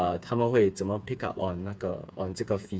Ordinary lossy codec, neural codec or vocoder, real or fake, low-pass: none; codec, 16 kHz, 4 kbps, FreqCodec, smaller model; fake; none